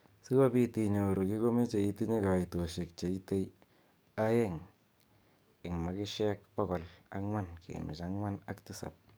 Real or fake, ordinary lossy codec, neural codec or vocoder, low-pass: fake; none; codec, 44.1 kHz, 7.8 kbps, DAC; none